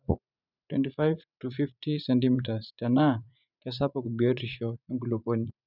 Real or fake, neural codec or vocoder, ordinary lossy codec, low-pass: fake; vocoder, 44.1 kHz, 80 mel bands, Vocos; none; 5.4 kHz